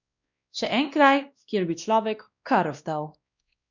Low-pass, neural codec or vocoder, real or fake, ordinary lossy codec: 7.2 kHz; codec, 16 kHz, 1 kbps, X-Codec, WavLM features, trained on Multilingual LibriSpeech; fake; none